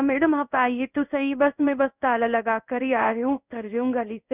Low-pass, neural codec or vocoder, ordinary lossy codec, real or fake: 3.6 kHz; codec, 16 kHz in and 24 kHz out, 1 kbps, XY-Tokenizer; none; fake